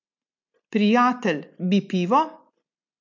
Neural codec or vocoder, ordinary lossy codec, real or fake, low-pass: none; MP3, 48 kbps; real; 7.2 kHz